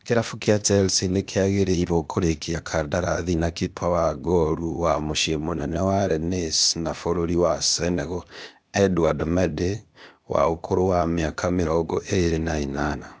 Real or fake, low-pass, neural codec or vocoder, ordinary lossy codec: fake; none; codec, 16 kHz, 0.8 kbps, ZipCodec; none